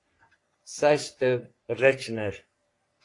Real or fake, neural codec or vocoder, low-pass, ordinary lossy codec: fake; codec, 44.1 kHz, 3.4 kbps, Pupu-Codec; 10.8 kHz; AAC, 48 kbps